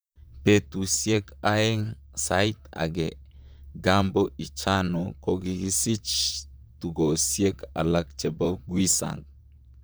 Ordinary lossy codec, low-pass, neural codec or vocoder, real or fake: none; none; vocoder, 44.1 kHz, 128 mel bands, Pupu-Vocoder; fake